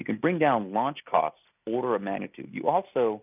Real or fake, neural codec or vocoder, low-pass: real; none; 3.6 kHz